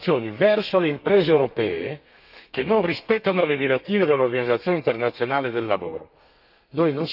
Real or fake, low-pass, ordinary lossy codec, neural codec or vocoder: fake; 5.4 kHz; none; codec, 32 kHz, 1.9 kbps, SNAC